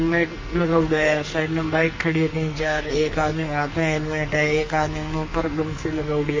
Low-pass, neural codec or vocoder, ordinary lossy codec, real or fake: 7.2 kHz; codec, 44.1 kHz, 2.6 kbps, SNAC; MP3, 32 kbps; fake